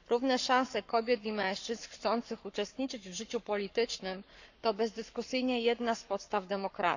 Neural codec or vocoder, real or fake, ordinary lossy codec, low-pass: codec, 44.1 kHz, 7.8 kbps, Pupu-Codec; fake; none; 7.2 kHz